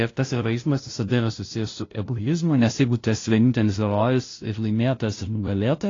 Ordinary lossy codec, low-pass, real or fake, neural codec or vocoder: AAC, 32 kbps; 7.2 kHz; fake; codec, 16 kHz, 0.5 kbps, FunCodec, trained on LibriTTS, 25 frames a second